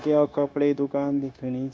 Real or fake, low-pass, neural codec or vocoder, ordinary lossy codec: fake; none; codec, 16 kHz, 0.9 kbps, LongCat-Audio-Codec; none